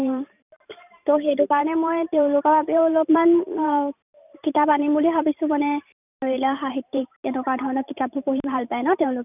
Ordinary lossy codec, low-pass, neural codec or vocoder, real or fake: none; 3.6 kHz; vocoder, 44.1 kHz, 128 mel bands every 512 samples, BigVGAN v2; fake